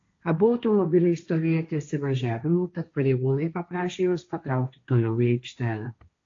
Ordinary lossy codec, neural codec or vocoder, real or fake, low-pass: AAC, 48 kbps; codec, 16 kHz, 1.1 kbps, Voila-Tokenizer; fake; 7.2 kHz